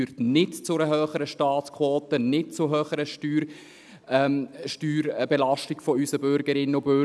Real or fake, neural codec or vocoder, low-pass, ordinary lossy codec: real; none; none; none